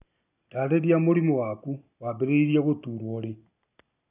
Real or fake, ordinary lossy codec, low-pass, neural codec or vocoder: real; none; 3.6 kHz; none